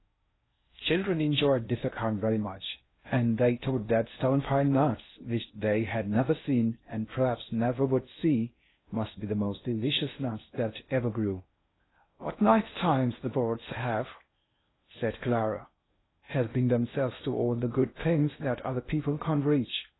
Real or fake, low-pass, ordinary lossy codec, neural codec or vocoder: fake; 7.2 kHz; AAC, 16 kbps; codec, 16 kHz in and 24 kHz out, 0.6 kbps, FocalCodec, streaming, 4096 codes